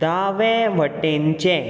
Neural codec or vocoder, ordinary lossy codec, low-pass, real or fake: none; none; none; real